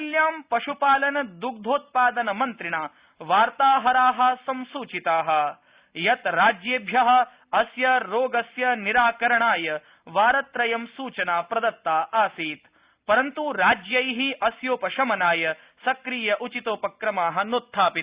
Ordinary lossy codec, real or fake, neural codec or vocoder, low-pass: Opus, 64 kbps; real; none; 3.6 kHz